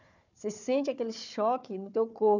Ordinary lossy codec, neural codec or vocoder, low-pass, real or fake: none; codec, 16 kHz, 4 kbps, FunCodec, trained on Chinese and English, 50 frames a second; 7.2 kHz; fake